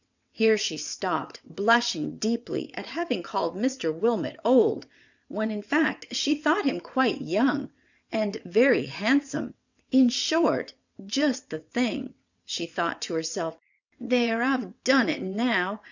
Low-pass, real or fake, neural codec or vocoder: 7.2 kHz; fake; vocoder, 22.05 kHz, 80 mel bands, WaveNeXt